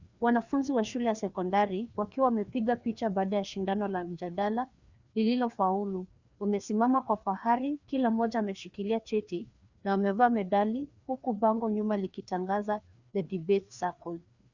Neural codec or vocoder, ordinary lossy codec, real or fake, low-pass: codec, 16 kHz, 2 kbps, FreqCodec, larger model; Opus, 64 kbps; fake; 7.2 kHz